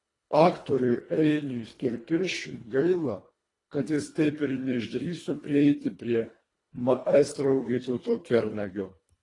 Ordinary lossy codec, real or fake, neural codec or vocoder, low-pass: AAC, 32 kbps; fake; codec, 24 kHz, 1.5 kbps, HILCodec; 10.8 kHz